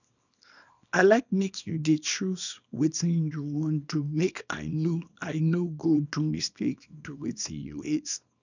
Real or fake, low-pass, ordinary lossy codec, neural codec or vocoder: fake; 7.2 kHz; none; codec, 24 kHz, 0.9 kbps, WavTokenizer, small release